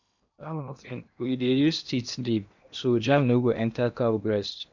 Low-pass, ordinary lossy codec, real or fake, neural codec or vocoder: 7.2 kHz; none; fake; codec, 16 kHz in and 24 kHz out, 0.8 kbps, FocalCodec, streaming, 65536 codes